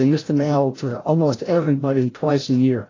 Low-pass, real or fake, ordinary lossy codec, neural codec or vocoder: 7.2 kHz; fake; AAC, 32 kbps; codec, 16 kHz, 0.5 kbps, FreqCodec, larger model